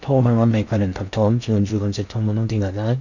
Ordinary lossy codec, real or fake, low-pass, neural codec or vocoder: none; fake; 7.2 kHz; codec, 16 kHz in and 24 kHz out, 0.6 kbps, FocalCodec, streaming, 4096 codes